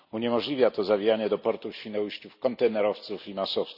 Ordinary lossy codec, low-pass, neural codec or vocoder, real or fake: none; 5.4 kHz; none; real